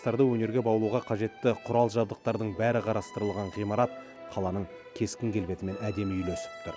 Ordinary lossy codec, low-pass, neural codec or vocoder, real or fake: none; none; none; real